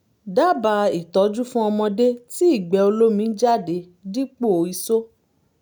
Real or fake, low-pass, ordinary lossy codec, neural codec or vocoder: real; none; none; none